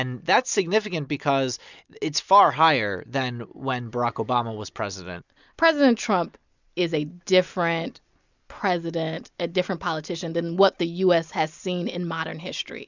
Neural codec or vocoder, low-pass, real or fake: none; 7.2 kHz; real